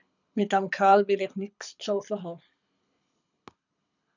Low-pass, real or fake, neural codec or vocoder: 7.2 kHz; fake; codec, 24 kHz, 6 kbps, HILCodec